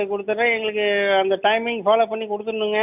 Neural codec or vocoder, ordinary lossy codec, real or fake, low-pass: none; none; real; 3.6 kHz